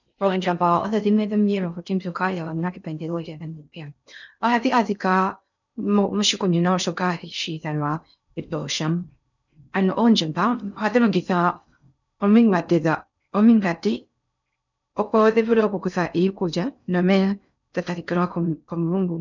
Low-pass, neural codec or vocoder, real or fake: 7.2 kHz; codec, 16 kHz in and 24 kHz out, 0.6 kbps, FocalCodec, streaming, 4096 codes; fake